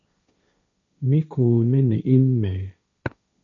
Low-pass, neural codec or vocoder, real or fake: 7.2 kHz; codec, 16 kHz, 1.1 kbps, Voila-Tokenizer; fake